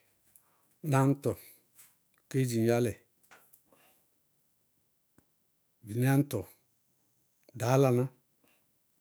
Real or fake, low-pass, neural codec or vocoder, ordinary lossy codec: fake; none; autoencoder, 48 kHz, 128 numbers a frame, DAC-VAE, trained on Japanese speech; none